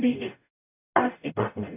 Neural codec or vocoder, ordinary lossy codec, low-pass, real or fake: codec, 44.1 kHz, 0.9 kbps, DAC; none; 3.6 kHz; fake